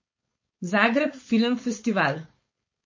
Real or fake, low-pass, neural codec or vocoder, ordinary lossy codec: fake; 7.2 kHz; codec, 16 kHz, 4.8 kbps, FACodec; MP3, 32 kbps